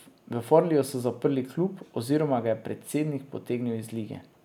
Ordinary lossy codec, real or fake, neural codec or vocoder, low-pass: none; real; none; 19.8 kHz